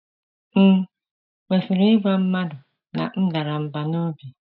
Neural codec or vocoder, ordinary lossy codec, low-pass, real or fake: none; none; 5.4 kHz; real